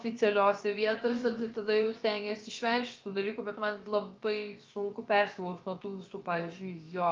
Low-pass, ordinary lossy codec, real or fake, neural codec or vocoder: 7.2 kHz; Opus, 32 kbps; fake; codec, 16 kHz, about 1 kbps, DyCAST, with the encoder's durations